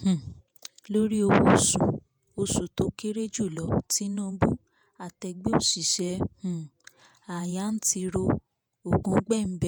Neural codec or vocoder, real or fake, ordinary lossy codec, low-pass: vocoder, 48 kHz, 128 mel bands, Vocos; fake; none; none